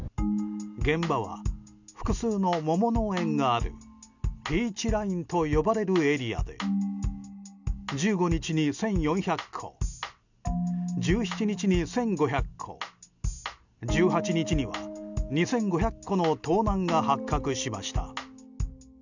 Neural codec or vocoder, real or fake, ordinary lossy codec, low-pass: none; real; none; 7.2 kHz